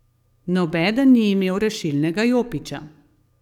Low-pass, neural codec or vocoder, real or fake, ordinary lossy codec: 19.8 kHz; codec, 44.1 kHz, 7.8 kbps, DAC; fake; none